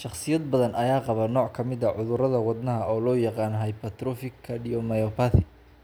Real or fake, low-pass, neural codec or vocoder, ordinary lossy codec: real; none; none; none